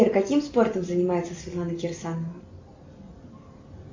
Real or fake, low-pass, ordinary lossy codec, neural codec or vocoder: real; 7.2 kHz; MP3, 48 kbps; none